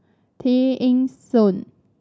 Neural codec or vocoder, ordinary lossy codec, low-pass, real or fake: none; none; none; real